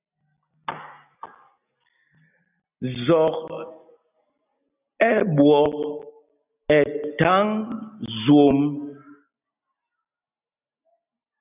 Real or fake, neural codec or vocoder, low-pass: real; none; 3.6 kHz